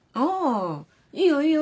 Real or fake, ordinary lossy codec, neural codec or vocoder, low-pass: real; none; none; none